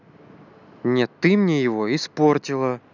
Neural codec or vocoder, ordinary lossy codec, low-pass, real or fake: none; none; 7.2 kHz; real